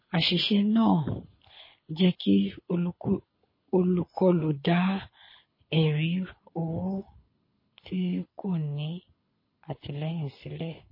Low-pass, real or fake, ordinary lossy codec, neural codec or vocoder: 5.4 kHz; fake; MP3, 24 kbps; codec, 24 kHz, 6 kbps, HILCodec